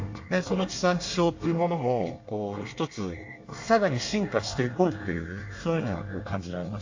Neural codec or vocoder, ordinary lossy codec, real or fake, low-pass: codec, 24 kHz, 1 kbps, SNAC; AAC, 48 kbps; fake; 7.2 kHz